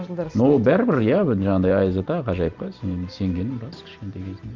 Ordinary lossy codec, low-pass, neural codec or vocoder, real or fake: Opus, 24 kbps; 7.2 kHz; vocoder, 44.1 kHz, 128 mel bands every 512 samples, BigVGAN v2; fake